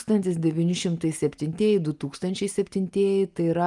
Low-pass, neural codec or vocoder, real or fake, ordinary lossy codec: 10.8 kHz; none; real; Opus, 32 kbps